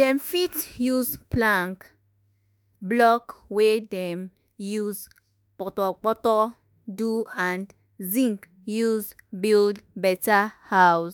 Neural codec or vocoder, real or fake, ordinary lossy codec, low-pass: autoencoder, 48 kHz, 32 numbers a frame, DAC-VAE, trained on Japanese speech; fake; none; none